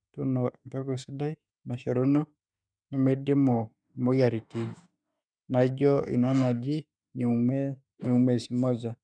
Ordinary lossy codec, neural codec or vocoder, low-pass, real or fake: none; autoencoder, 48 kHz, 32 numbers a frame, DAC-VAE, trained on Japanese speech; 9.9 kHz; fake